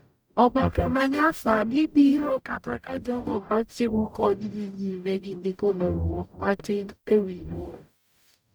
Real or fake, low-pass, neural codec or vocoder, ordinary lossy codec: fake; none; codec, 44.1 kHz, 0.9 kbps, DAC; none